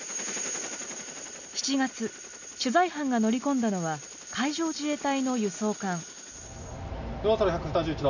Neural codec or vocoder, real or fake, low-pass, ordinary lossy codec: none; real; 7.2 kHz; none